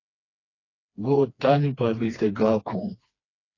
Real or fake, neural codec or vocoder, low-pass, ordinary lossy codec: fake; codec, 16 kHz, 2 kbps, FreqCodec, smaller model; 7.2 kHz; AAC, 32 kbps